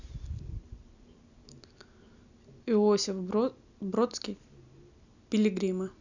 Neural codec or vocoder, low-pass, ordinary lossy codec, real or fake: none; 7.2 kHz; none; real